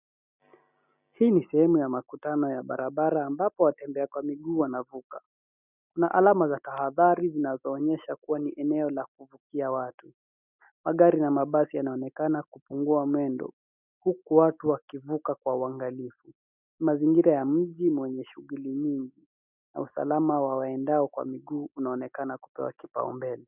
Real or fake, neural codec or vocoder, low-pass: real; none; 3.6 kHz